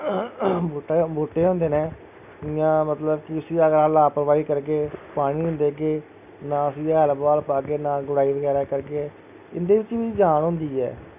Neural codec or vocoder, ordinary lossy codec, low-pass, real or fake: none; none; 3.6 kHz; real